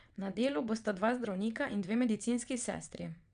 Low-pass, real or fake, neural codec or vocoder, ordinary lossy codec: 9.9 kHz; fake; vocoder, 22.05 kHz, 80 mel bands, WaveNeXt; none